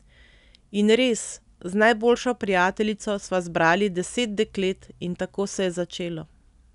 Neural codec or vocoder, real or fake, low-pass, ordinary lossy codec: none; real; 10.8 kHz; none